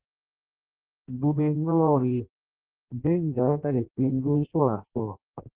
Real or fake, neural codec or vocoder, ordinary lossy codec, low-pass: fake; codec, 16 kHz in and 24 kHz out, 0.6 kbps, FireRedTTS-2 codec; Opus, 16 kbps; 3.6 kHz